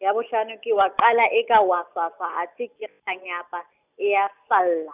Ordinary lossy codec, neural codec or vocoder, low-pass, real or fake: none; none; 3.6 kHz; real